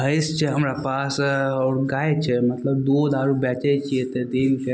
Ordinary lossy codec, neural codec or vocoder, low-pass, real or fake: none; none; none; real